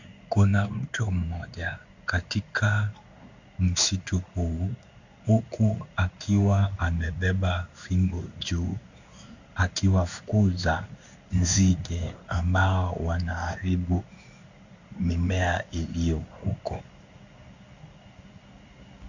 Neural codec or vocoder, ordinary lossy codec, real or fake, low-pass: codec, 16 kHz in and 24 kHz out, 1 kbps, XY-Tokenizer; Opus, 64 kbps; fake; 7.2 kHz